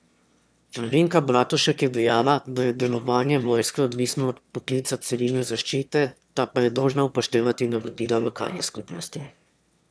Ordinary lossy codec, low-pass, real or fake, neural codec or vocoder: none; none; fake; autoencoder, 22.05 kHz, a latent of 192 numbers a frame, VITS, trained on one speaker